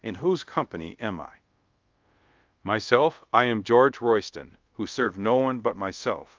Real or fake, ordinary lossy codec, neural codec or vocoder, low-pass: fake; Opus, 24 kbps; codec, 24 kHz, 0.5 kbps, DualCodec; 7.2 kHz